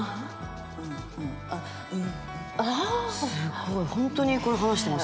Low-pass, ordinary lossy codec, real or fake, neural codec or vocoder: none; none; real; none